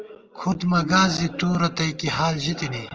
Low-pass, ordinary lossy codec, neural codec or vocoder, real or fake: 7.2 kHz; Opus, 24 kbps; none; real